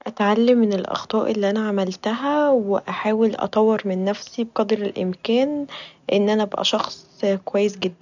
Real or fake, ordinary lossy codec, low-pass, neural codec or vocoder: real; none; 7.2 kHz; none